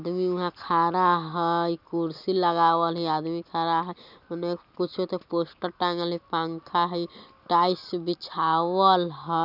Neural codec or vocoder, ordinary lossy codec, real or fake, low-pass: none; none; real; 5.4 kHz